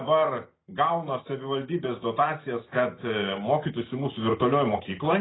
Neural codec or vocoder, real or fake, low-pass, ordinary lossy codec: none; real; 7.2 kHz; AAC, 16 kbps